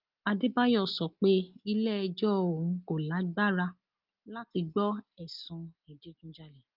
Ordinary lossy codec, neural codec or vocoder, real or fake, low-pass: Opus, 24 kbps; none; real; 5.4 kHz